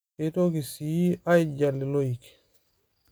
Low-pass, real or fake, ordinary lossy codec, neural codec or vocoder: none; real; none; none